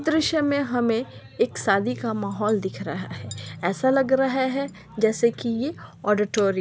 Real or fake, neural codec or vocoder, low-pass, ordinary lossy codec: real; none; none; none